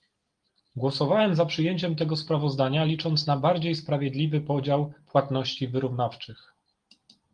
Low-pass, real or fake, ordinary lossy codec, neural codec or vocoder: 9.9 kHz; real; Opus, 24 kbps; none